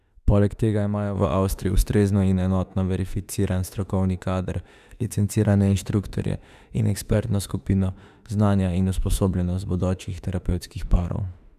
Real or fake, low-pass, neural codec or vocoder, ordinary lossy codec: fake; 14.4 kHz; autoencoder, 48 kHz, 32 numbers a frame, DAC-VAE, trained on Japanese speech; none